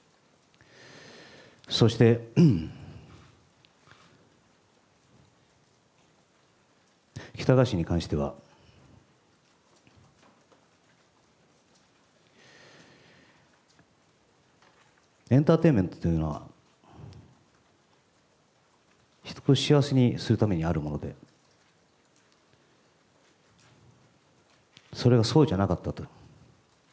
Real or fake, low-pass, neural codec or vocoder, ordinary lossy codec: real; none; none; none